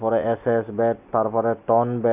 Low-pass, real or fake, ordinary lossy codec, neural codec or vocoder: 3.6 kHz; real; none; none